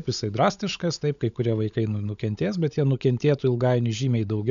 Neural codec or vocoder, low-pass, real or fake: codec, 16 kHz, 16 kbps, FunCodec, trained on LibriTTS, 50 frames a second; 7.2 kHz; fake